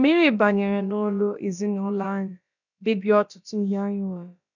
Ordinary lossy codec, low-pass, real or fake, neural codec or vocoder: none; 7.2 kHz; fake; codec, 16 kHz, about 1 kbps, DyCAST, with the encoder's durations